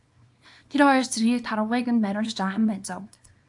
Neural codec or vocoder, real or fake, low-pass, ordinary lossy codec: codec, 24 kHz, 0.9 kbps, WavTokenizer, small release; fake; 10.8 kHz; AAC, 64 kbps